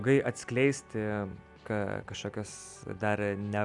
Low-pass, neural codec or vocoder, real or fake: 10.8 kHz; none; real